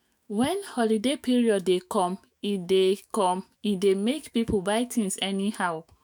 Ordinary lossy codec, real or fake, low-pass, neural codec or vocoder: none; fake; none; autoencoder, 48 kHz, 128 numbers a frame, DAC-VAE, trained on Japanese speech